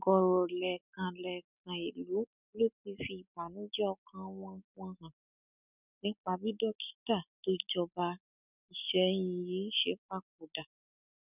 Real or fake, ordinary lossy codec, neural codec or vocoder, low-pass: real; none; none; 3.6 kHz